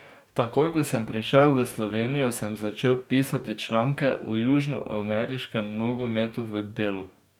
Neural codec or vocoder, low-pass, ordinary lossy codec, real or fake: codec, 44.1 kHz, 2.6 kbps, DAC; 19.8 kHz; none; fake